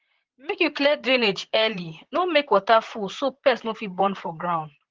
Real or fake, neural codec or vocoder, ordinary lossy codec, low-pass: fake; vocoder, 44.1 kHz, 128 mel bands, Pupu-Vocoder; Opus, 16 kbps; 7.2 kHz